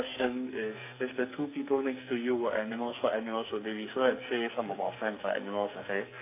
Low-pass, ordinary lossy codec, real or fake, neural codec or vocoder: 3.6 kHz; AAC, 24 kbps; fake; codec, 44.1 kHz, 2.6 kbps, SNAC